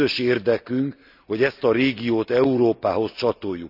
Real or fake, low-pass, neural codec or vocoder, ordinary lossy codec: real; 5.4 kHz; none; none